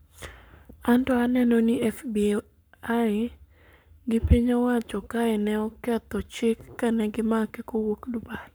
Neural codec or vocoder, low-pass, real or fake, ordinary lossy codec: codec, 44.1 kHz, 7.8 kbps, Pupu-Codec; none; fake; none